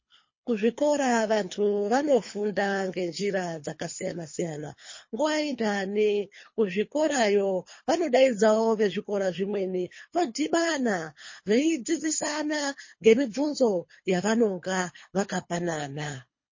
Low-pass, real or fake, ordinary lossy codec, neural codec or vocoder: 7.2 kHz; fake; MP3, 32 kbps; codec, 24 kHz, 3 kbps, HILCodec